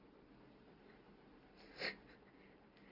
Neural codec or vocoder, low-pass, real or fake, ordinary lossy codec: none; 5.4 kHz; real; Opus, 32 kbps